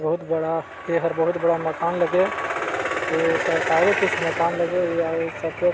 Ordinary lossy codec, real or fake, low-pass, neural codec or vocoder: none; real; none; none